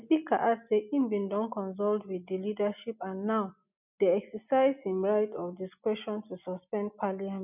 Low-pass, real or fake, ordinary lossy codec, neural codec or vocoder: 3.6 kHz; real; none; none